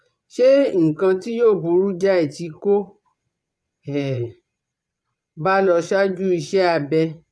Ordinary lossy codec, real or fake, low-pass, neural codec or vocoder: none; fake; none; vocoder, 22.05 kHz, 80 mel bands, Vocos